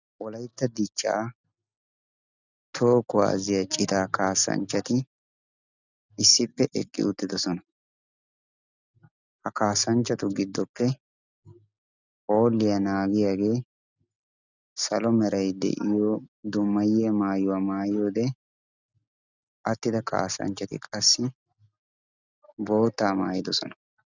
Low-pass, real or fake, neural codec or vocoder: 7.2 kHz; real; none